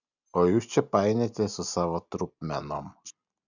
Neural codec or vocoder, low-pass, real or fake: none; 7.2 kHz; real